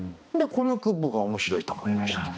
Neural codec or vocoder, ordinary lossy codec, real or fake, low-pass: codec, 16 kHz, 2 kbps, X-Codec, HuBERT features, trained on balanced general audio; none; fake; none